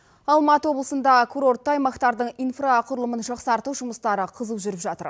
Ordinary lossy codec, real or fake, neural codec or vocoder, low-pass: none; real; none; none